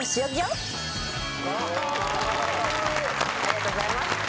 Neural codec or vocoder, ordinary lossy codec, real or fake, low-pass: none; none; real; none